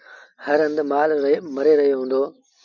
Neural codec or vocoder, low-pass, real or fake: none; 7.2 kHz; real